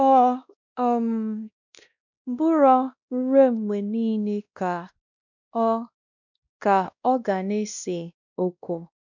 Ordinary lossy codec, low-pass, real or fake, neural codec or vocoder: none; 7.2 kHz; fake; codec, 16 kHz, 1 kbps, X-Codec, WavLM features, trained on Multilingual LibriSpeech